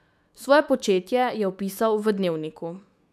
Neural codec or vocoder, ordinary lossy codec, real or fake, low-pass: autoencoder, 48 kHz, 128 numbers a frame, DAC-VAE, trained on Japanese speech; none; fake; 14.4 kHz